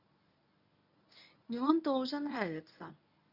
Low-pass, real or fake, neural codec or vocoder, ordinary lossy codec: 5.4 kHz; fake; codec, 24 kHz, 0.9 kbps, WavTokenizer, medium speech release version 1; none